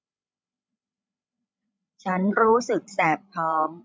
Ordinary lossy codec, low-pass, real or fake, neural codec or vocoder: none; none; fake; codec, 16 kHz, 16 kbps, FreqCodec, larger model